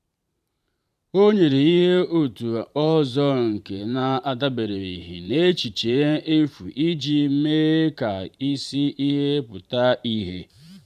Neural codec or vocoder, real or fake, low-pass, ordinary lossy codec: vocoder, 44.1 kHz, 128 mel bands every 512 samples, BigVGAN v2; fake; 14.4 kHz; none